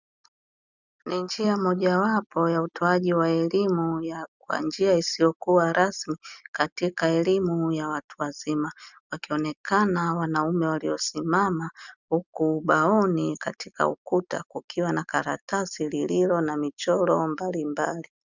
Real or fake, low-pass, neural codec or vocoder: real; 7.2 kHz; none